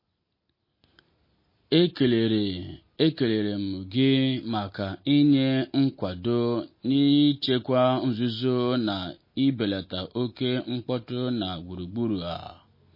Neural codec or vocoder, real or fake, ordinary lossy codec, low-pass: none; real; MP3, 24 kbps; 5.4 kHz